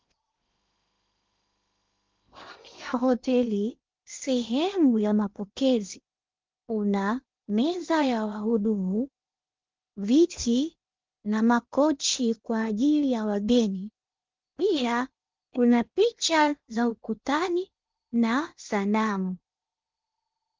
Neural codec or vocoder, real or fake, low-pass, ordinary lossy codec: codec, 16 kHz in and 24 kHz out, 0.8 kbps, FocalCodec, streaming, 65536 codes; fake; 7.2 kHz; Opus, 24 kbps